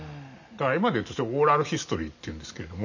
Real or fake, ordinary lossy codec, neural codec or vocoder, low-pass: real; none; none; 7.2 kHz